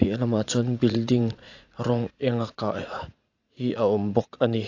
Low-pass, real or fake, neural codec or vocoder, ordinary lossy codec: 7.2 kHz; fake; vocoder, 44.1 kHz, 80 mel bands, Vocos; AAC, 48 kbps